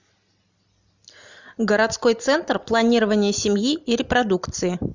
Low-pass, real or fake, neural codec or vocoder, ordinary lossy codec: 7.2 kHz; real; none; Opus, 64 kbps